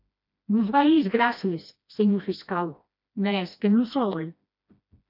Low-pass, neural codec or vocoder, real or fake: 5.4 kHz; codec, 16 kHz, 1 kbps, FreqCodec, smaller model; fake